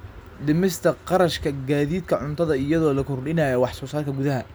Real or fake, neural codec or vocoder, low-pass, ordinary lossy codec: real; none; none; none